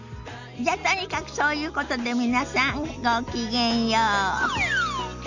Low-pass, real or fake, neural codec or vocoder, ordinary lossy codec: 7.2 kHz; real; none; none